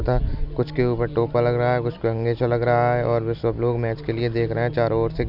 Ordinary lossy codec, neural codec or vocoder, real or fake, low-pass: none; none; real; 5.4 kHz